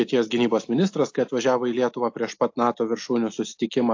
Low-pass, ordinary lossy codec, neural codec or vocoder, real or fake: 7.2 kHz; AAC, 48 kbps; none; real